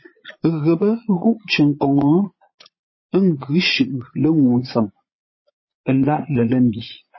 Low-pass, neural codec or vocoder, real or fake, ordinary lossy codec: 7.2 kHz; vocoder, 22.05 kHz, 80 mel bands, Vocos; fake; MP3, 24 kbps